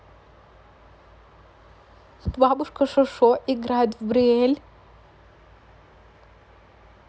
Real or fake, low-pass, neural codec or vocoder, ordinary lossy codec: real; none; none; none